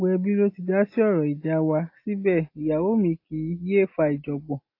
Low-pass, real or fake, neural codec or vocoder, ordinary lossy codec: 5.4 kHz; real; none; AAC, 32 kbps